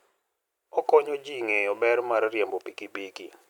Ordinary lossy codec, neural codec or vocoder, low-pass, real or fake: none; none; 19.8 kHz; real